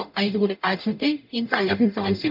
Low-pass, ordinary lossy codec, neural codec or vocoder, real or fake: 5.4 kHz; none; codec, 44.1 kHz, 0.9 kbps, DAC; fake